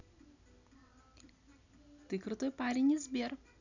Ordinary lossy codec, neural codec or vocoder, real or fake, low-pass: none; none; real; 7.2 kHz